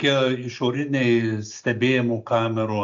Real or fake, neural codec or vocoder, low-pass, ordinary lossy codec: real; none; 7.2 kHz; AAC, 64 kbps